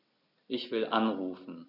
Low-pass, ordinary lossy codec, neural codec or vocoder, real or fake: 5.4 kHz; none; none; real